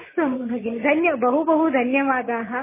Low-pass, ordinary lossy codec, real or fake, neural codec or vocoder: 3.6 kHz; MP3, 16 kbps; real; none